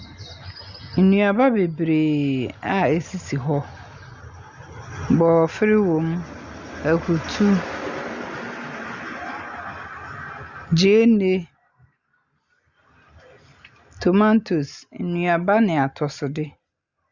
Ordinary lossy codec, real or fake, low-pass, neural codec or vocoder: Opus, 64 kbps; real; 7.2 kHz; none